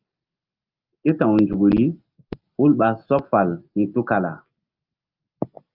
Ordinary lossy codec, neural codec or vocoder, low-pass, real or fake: Opus, 32 kbps; none; 5.4 kHz; real